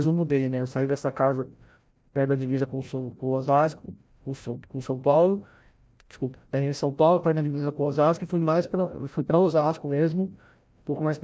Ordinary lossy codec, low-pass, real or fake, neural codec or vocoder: none; none; fake; codec, 16 kHz, 0.5 kbps, FreqCodec, larger model